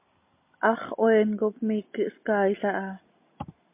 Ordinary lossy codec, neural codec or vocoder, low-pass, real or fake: MP3, 24 kbps; none; 3.6 kHz; real